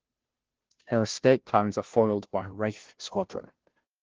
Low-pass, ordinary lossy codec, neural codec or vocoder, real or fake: 7.2 kHz; Opus, 16 kbps; codec, 16 kHz, 0.5 kbps, FunCodec, trained on Chinese and English, 25 frames a second; fake